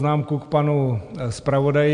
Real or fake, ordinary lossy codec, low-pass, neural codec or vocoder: real; MP3, 64 kbps; 9.9 kHz; none